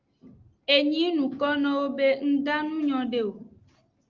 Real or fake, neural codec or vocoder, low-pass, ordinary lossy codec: real; none; 7.2 kHz; Opus, 24 kbps